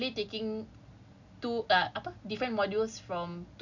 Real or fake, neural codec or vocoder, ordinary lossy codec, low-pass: real; none; none; 7.2 kHz